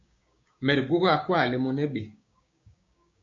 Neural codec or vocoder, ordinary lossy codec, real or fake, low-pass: codec, 16 kHz, 6 kbps, DAC; AAC, 48 kbps; fake; 7.2 kHz